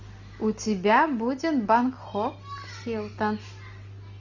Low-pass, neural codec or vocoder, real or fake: 7.2 kHz; none; real